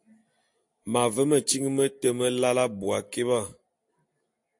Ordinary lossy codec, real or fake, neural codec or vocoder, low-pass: AAC, 64 kbps; real; none; 10.8 kHz